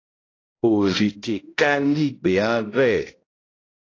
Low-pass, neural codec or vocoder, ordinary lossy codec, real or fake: 7.2 kHz; codec, 16 kHz, 0.5 kbps, X-Codec, HuBERT features, trained on balanced general audio; AAC, 32 kbps; fake